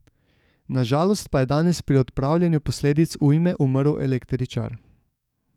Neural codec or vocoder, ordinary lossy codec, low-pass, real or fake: codec, 44.1 kHz, 7.8 kbps, DAC; none; 19.8 kHz; fake